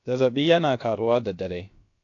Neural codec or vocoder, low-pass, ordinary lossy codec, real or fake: codec, 16 kHz, about 1 kbps, DyCAST, with the encoder's durations; 7.2 kHz; AAC, 48 kbps; fake